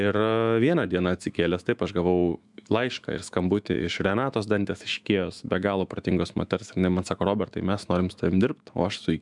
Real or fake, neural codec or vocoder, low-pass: fake; autoencoder, 48 kHz, 128 numbers a frame, DAC-VAE, trained on Japanese speech; 10.8 kHz